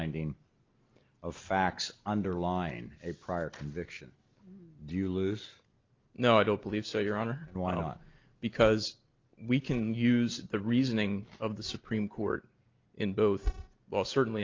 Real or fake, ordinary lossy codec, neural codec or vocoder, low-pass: real; Opus, 24 kbps; none; 7.2 kHz